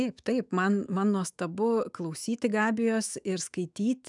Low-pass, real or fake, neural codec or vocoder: 10.8 kHz; fake; autoencoder, 48 kHz, 128 numbers a frame, DAC-VAE, trained on Japanese speech